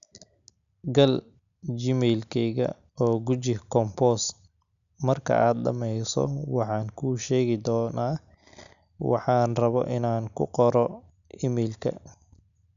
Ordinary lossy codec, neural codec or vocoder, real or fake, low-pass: none; none; real; 7.2 kHz